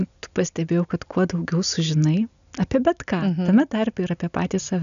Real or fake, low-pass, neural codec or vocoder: real; 7.2 kHz; none